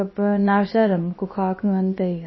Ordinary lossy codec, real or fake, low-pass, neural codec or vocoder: MP3, 24 kbps; fake; 7.2 kHz; codec, 16 kHz, about 1 kbps, DyCAST, with the encoder's durations